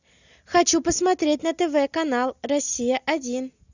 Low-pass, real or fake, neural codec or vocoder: 7.2 kHz; real; none